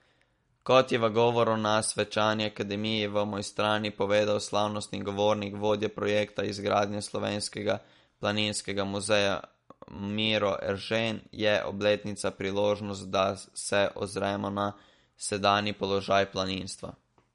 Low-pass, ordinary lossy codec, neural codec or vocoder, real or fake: 10.8 kHz; MP3, 48 kbps; none; real